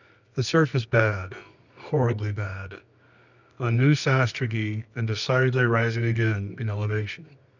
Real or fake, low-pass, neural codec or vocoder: fake; 7.2 kHz; codec, 24 kHz, 0.9 kbps, WavTokenizer, medium music audio release